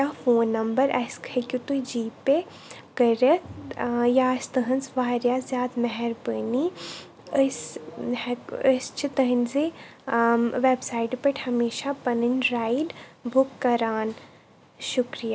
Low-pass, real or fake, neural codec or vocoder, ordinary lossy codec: none; real; none; none